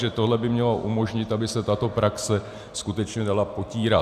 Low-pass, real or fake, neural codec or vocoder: 14.4 kHz; real; none